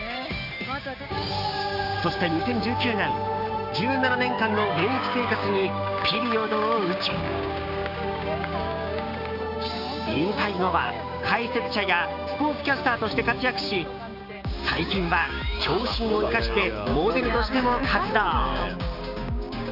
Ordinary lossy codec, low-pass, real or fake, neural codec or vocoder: none; 5.4 kHz; fake; codec, 16 kHz, 6 kbps, DAC